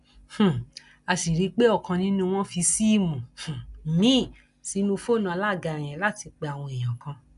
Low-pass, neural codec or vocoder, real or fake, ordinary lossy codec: 10.8 kHz; none; real; none